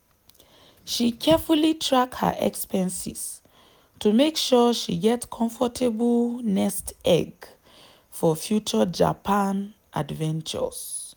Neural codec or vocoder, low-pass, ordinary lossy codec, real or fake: none; none; none; real